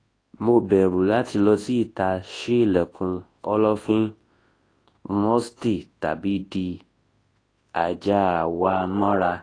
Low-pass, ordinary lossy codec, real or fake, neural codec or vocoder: 9.9 kHz; AAC, 32 kbps; fake; codec, 24 kHz, 0.9 kbps, WavTokenizer, large speech release